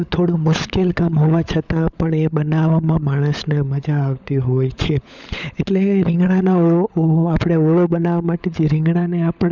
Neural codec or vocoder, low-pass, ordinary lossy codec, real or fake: codec, 16 kHz, 16 kbps, FunCodec, trained on LibriTTS, 50 frames a second; 7.2 kHz; none; fake